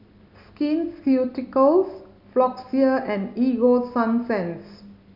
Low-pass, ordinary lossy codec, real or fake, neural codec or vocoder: 5.4 kHz; none; real; none